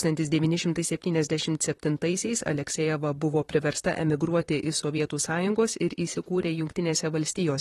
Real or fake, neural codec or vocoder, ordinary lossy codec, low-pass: fake; vocoder, 44.1 kHz, 128 mel bands, Pupu-Vocoder; AAC, 32 kbps; 19.8 kHz